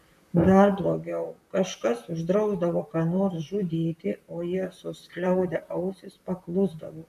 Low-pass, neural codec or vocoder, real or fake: 14.4 kHz; vocoder, 44.1 kHz, 128 mel bands, Pupu-Vocoder; fake